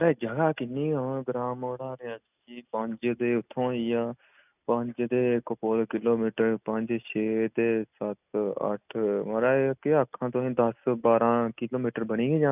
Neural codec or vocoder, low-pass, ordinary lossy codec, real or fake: none; 3.6 kHz; none; real